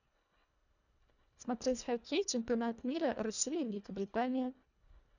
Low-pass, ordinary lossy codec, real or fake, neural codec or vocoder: 7.2 kHz; none; fake; codec, 24 kHz, 1.5 kbps, HILCodec